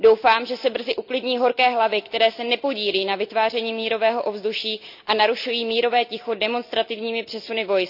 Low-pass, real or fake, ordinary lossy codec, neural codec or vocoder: 5.4 kHz; real; none; none